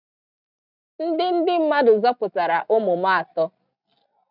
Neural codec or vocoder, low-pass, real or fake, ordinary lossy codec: none; 5.4 kHz; real; none